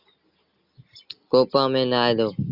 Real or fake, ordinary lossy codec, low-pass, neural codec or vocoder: real; Opus, 64 kbps; 5.4 kHz; none